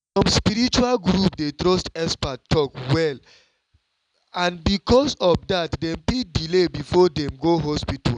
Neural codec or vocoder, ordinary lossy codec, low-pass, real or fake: none; none; 9.9 kHz; real